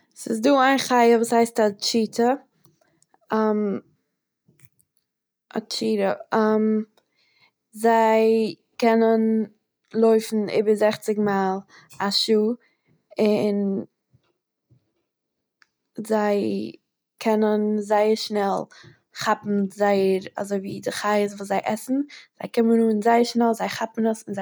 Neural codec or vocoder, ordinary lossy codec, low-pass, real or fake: none; none; none; real